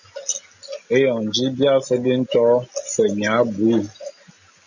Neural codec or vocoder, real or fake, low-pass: none; real; 7.2 kHz